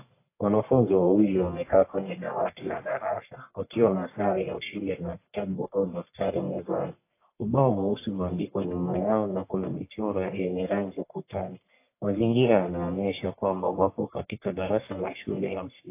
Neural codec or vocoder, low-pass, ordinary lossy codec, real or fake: codec, 44.1 kHz, 1.7 kbps, Pupu-Codec; 3.6 kHz; AAC, 24 kbps; fake